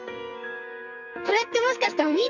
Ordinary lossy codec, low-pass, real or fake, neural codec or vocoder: none; 7.2 kHz; fake; codec, 44.1 kHz, 2.6 kbps, SNAC